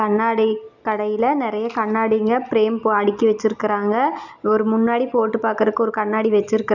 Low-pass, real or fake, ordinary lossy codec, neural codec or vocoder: 7.2 kHz; real; none; none